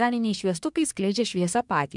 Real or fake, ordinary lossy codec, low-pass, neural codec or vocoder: fake; MP3, 96 kbps; 10.8 kHz; codec, 24 kHz, 1 kbps, SNAC